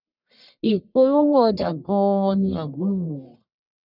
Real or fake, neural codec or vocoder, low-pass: fake; codec, 44.1 kHz, 1.7 kbps, Pupu-Codec; 5.4 kHz